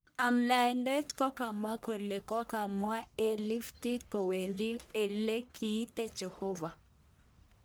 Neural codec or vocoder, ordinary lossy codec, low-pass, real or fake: codec, 44.1 kHz, 1.7 kbps, Pupu-Codec; none; none; fake